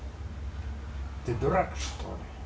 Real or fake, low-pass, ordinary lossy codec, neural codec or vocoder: real; none; none; none